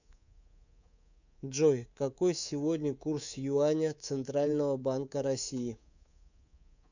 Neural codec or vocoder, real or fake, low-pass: codec, 24 kHz, 3.1 kbps, DualCodec; fake; 7.2 kHz